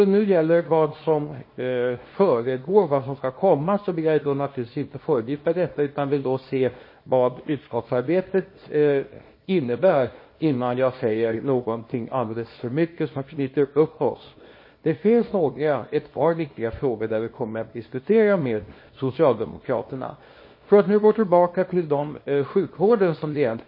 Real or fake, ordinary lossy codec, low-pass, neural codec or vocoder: fake; MP3, 24 kbps; 5.4 kHz; codec, 24 kHz, 0.9 kbps, WavTokenizer, small release